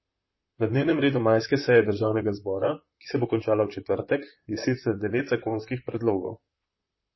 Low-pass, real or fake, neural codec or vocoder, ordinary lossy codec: 7.2 kHz; fake; vocoder, 44.1 kHz, 128 mel bands, Pupu-Vocoder; MP3, 24 kbps